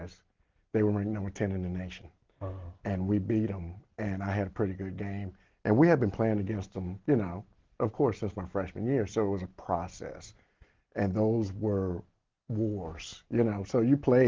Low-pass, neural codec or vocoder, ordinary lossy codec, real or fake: 7.2 kHz; none; Opus, 16 kbps; real